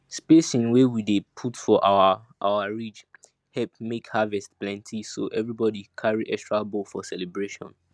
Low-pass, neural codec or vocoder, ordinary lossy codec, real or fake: none; none; none; real